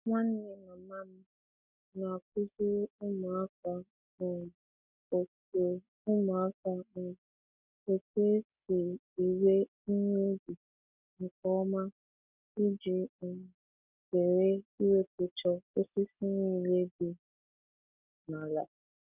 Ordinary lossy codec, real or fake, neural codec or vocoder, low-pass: none; real; none; 3.6 kHz